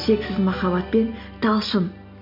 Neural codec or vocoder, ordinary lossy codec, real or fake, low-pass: none; MP3, 48 kbps; real; 5.4 kHz